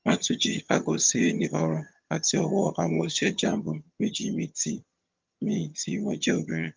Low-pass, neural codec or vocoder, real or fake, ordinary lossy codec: 7.2 kHz; vocoder, 22.05 kHz, 80 mel bands, HiFi-GAN; fake; Opus, 24 kbps